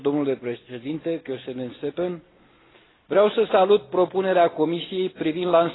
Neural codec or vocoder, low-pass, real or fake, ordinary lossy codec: none; 7.2 kHz; real; AAC, 16 kbps